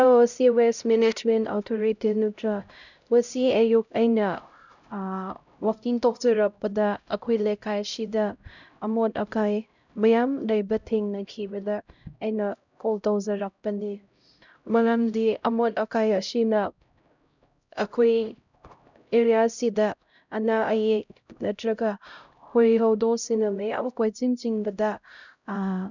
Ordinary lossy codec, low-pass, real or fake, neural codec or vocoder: none; 7.2 kHz; fake; codec, 16 kHz, 0.5 kbps, X-Codec, HuBERT features, trained on LibriSpeech